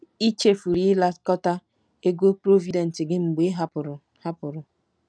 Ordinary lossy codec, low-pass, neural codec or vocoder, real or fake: none; 9.9 kHz; none; real